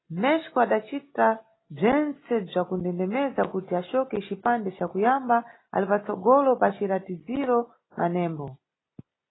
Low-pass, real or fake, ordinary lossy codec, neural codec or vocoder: 7.2 kHz; real; AAC, 16 kbps; none